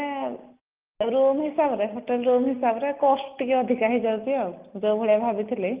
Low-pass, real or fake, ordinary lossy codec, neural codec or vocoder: 3.6 kHz; real; Opus, 32 kbps; none